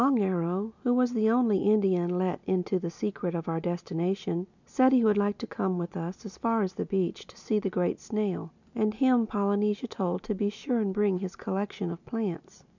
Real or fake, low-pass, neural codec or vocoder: real; 7.2 kHz; none